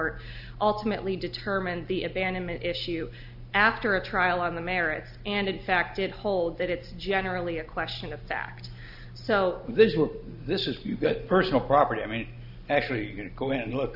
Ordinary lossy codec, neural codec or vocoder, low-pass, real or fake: AAC, 48 kbps; none; 5.4 kHz; real